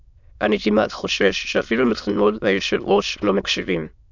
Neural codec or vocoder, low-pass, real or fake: autoencoder, 22.05 kHz, a latent of 192 numbers a frame, VITS, trained on many speakers; 7.2 kHz; fake